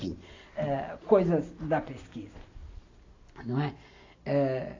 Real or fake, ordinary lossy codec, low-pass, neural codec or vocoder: real; AAC, 32 kbps; 7.2 kHz; none